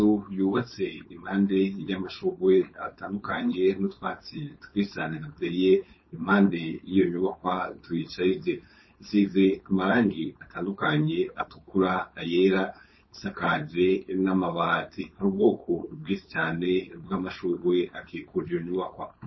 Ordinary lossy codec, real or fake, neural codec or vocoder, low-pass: MP3, 24 kbps; fake; codec, 16 kHz, 4.8 kbps, FACodec; 7.2 kHz